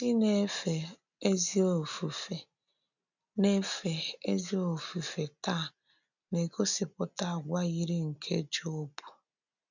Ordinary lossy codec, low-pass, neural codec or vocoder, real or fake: none; 7.2 kHz; none; real